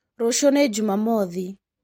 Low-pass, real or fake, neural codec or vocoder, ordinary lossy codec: 19.8 kHz; real; none; MP3, 64 kbps